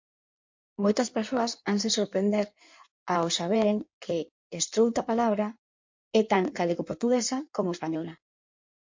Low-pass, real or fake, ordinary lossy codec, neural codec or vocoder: 7.2 kHz; fake; MP3, 48 kbps; codec, 16 kHz in and 24 kHz out, 1.1 kbps, FireRedTTS-2 codec